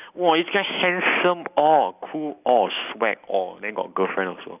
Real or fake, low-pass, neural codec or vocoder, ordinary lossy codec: real; 3.6 kHz; none; none